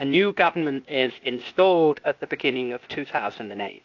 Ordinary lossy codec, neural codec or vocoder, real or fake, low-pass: AAC, 48 kbps; codec, 16 kHz, 0.8 kbps, ZipCodec; fake; 7.2 kHz